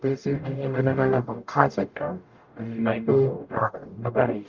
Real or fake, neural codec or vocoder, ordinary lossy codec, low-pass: fake; codec, 44.1 kHz, 0.9 kbps, DAC; Opus, 24 kbps; 7.2 kHz